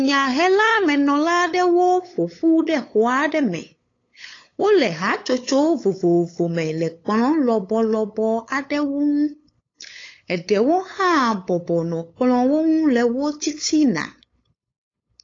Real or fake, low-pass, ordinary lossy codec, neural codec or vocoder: fake; 7.2 kHz; AAC, 32 kbps; codec, 16 kHz, 8 kbps, FunCodec, trained on LibriTTS, 25 frames a second